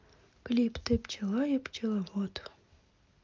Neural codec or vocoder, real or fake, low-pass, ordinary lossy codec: none; real; 7.2 kHz; Opus, 32 kbps